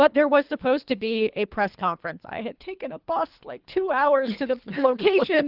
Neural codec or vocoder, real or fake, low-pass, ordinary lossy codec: codec, 24 kHz, 3 kbps, HILCodec; fake; 5.4 kHz; Opus, 24 kbps